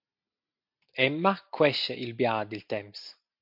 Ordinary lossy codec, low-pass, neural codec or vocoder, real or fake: MP3, 48 kbps; 5.4 kHz; none; real